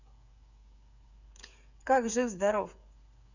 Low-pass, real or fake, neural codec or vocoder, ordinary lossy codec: 7.2 kHz; fake; codec, 16 kHz, 8 kbps, FreqCodec, smaller model; none